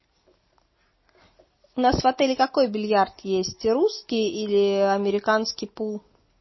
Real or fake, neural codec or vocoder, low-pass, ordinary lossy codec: real; none; 7.2 kHz; MP3, 24 kbps